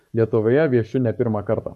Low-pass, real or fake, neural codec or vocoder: 14.4 kHz; fake; codec, 44.1 kHz, 7.8 kbps, Pupu-Codec